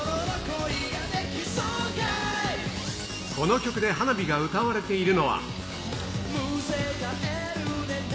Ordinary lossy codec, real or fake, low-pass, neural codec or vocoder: none; real; none; none